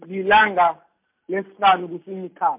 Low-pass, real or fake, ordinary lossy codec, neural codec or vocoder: 3.6 kHz; real; MP3, 32 kbps; none